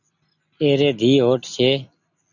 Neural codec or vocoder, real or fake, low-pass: none; real; 7.2 kHz